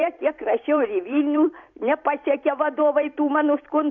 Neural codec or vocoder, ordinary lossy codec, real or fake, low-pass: vocoder, 44.1 kHz, 128 mel bands every 256 samples, BigVGAN v2; MP3, 48 kbps; fake; 7.2 kHz